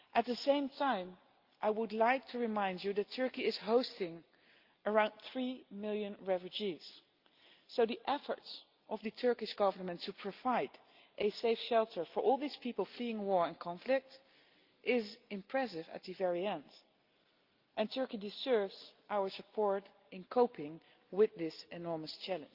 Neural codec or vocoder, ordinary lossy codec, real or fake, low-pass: none; Opus, 32 kbps; real; 5.4 kHz